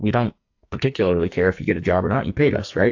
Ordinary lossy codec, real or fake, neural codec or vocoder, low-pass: AAC, 48 kbps; fake; codec, 44.1 kHz, 2.6 kbps, SNAC; 7.2 kHz